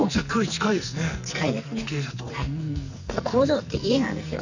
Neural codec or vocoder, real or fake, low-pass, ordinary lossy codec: codec, 44.1 kHz, 2.6 kbps, SNAC; fake; 7.2 kHz; none